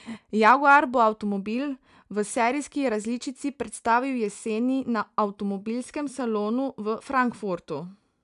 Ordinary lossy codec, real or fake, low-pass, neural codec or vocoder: none; real; 10.8 kHz; none